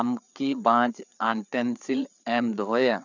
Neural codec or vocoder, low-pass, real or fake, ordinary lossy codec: codec, 16 kHz, 4 kbps, FreqCodec, larger model; 7.2 kHz; fake; none